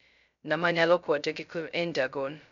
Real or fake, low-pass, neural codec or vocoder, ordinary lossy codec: fake; 7.2 kHz; codec, 16 kHz, 0.2 kbps, FocalCodec; none